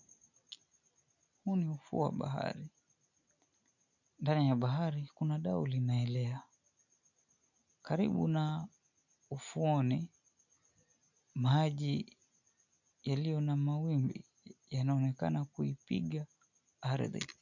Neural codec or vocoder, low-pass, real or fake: none; 7.2 kHz; real